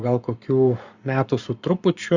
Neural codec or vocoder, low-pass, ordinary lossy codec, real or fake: codec, 44.1 kHz, 7.8 kbps, Pupu-Codec; 7.2 kHz; Opus, 64 kbps; fake